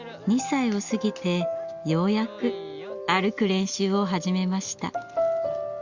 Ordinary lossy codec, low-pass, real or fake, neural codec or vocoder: Opus, 64 kbps; 7.2 kHz; real; none